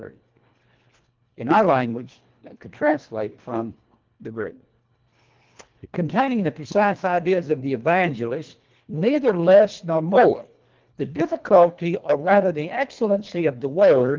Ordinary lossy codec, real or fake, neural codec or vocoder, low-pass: Opus, 32 kbps; fake; codec, 24 kHz, 1.5 kbps, HILCodec; 7.2 kHz